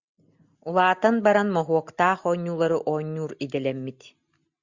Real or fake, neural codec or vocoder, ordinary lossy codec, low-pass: real; none; Opus, 64 kbps; 7.2 kHz